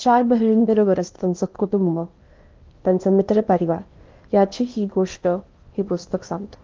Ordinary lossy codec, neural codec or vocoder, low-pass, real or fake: Opus, 32 kbps; codec, 16 kHz in and 24 kHz out, 0.8 kbps, FocalCodec, streaming, 65536 codes; 7.2 kHz; fake